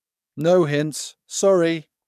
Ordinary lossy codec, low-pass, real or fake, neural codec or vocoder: MP3, 96 kbps; 14.4 kHz; fake; codec, 44.1 kHz, 7.8 kbps, DAC